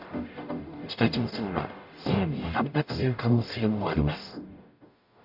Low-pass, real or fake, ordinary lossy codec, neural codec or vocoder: 5.4 kHz; fake; none; codec, 44.1 kHz, 0.9 kbps, DAC